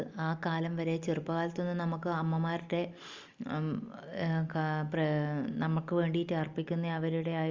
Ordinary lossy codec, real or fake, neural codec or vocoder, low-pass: Opus, 32 kbps; real; none; 7.2 kHz